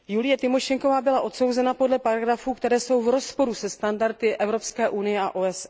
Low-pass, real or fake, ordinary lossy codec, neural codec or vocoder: none; real; none; none